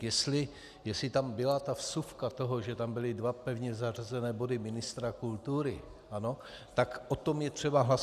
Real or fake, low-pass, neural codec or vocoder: real; 14.4 kHz; none